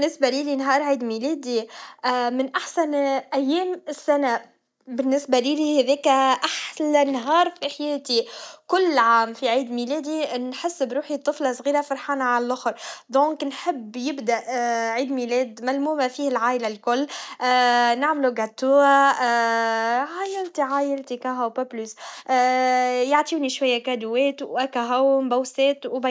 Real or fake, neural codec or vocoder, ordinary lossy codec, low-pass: real; none; none; none